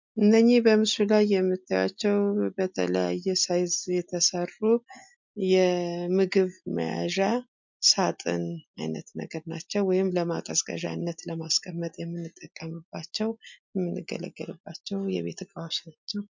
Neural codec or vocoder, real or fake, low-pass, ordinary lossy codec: none; real; 7.2 kHz; MP3, 64 kbps